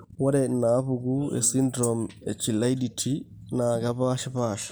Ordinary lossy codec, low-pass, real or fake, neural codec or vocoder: none; none; real; none